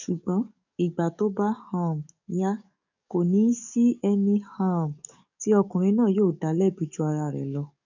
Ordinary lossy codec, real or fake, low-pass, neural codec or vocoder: none; fake; 7.2 kHz; codec, 44.1 kHz, 7.8 kbps, DAC